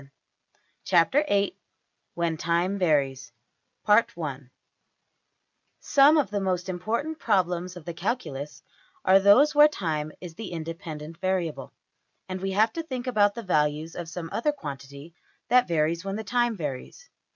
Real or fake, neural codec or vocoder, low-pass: real; none; 7.2 kHz